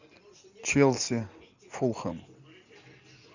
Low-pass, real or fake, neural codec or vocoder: 7.2 kHz; real; none